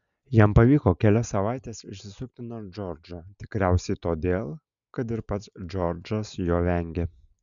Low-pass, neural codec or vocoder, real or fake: 7.2 kHz; none; real